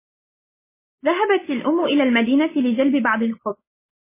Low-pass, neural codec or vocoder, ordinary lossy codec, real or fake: 3.6 kHz; none; MP3, 16 kbps; real